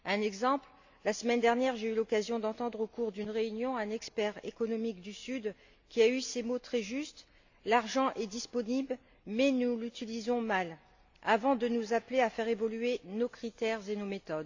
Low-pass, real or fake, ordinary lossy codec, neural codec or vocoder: 7.2 kHz; real; AAC, 48 kbps; none